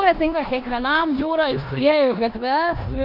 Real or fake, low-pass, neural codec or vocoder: fake; 5.4 kHz; codec, 16 kHz in and 24 kHz out, 0.9 kbps, LongCat-Audio-Codec, four codebook decoder